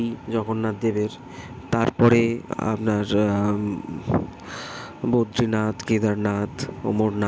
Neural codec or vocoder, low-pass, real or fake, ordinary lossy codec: none; none; real; none